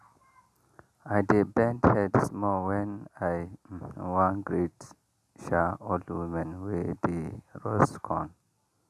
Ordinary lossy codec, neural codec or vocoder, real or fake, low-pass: none; none; real; 14.4 kHz